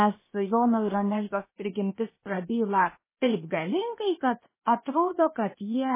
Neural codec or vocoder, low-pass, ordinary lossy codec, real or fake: codec, 16 kHz, 0.8 kbps, ZipCodec; 3.6 kHz; MP3, 16 kbps; fake